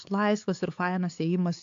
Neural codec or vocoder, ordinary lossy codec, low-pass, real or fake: codec, 16 kHz, 4 kbps, X-Codec, HuBERT features, trained on LibriSpeech; AAC, 48 kbps; 7.2 kHz; fake